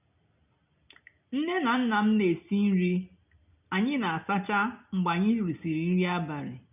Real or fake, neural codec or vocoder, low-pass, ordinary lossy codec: real; none; 3.6 kHz; none